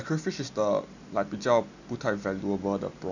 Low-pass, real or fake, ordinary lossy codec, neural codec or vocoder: 7.2 kHz; real; none; none